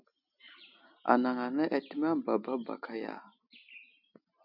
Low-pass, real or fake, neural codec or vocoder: 5.4 kHz; real; none